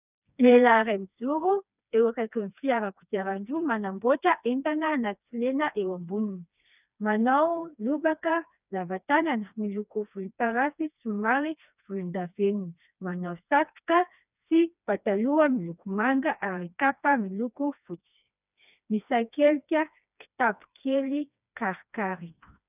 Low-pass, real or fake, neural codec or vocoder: 3.6 kHz; fake; codec, 16 kHz, 2 kbps, FreqCodec, smaller model